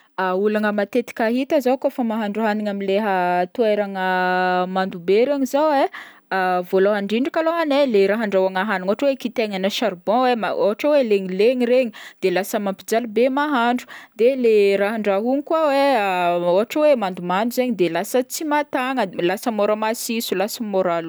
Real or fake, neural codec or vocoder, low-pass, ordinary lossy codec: real; none; none; none